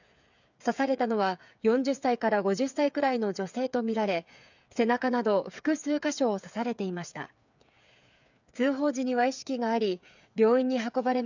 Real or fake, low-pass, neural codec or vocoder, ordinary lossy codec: fake; 7.2 kHz; codec, 16 kHz, 8 kbps, FreqCodec, smaller model; none